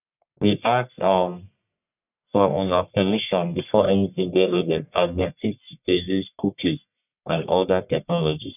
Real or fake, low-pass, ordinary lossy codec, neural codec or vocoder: fake; 3.6 kHz; none; codec, 44.1 kHz, 1.7 kbps, Pupu-Codec